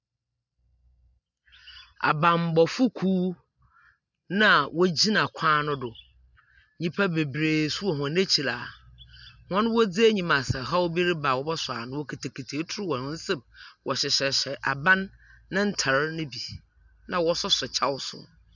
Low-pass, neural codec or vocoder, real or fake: 7.2 kHz; none; real